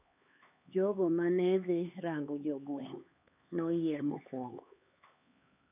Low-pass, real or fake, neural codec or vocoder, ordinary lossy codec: 3.6 kHz; fake; codec, 16 kHz, 4 kbps, X-Codec, HuBERT features, trained on LibriSpeech; none